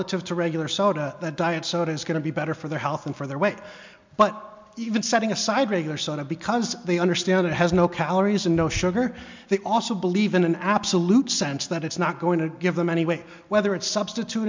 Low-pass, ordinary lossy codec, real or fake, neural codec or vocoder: 7.2 kHz; MP3, 64 kbps; real; none